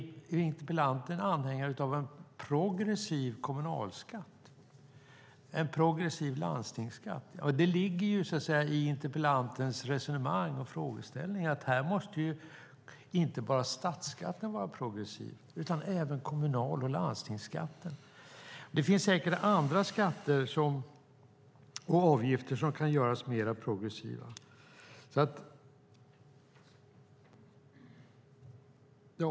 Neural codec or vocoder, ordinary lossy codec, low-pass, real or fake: none; none; none; real